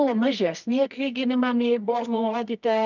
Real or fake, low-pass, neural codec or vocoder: fake; 7.2 kHz; codec, 24 kHz, 0.9 kbps, WavTokenizer, medium music audio release